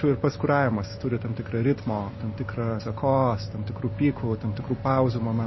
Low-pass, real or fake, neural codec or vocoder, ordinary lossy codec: 7.2 kHz; real; none; MP3, 24 kbps